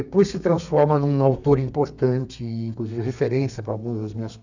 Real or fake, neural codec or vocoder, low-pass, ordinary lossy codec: fake; codec, 32 kHz, 1.9 kbps, SNAC; 7.2 kHz; none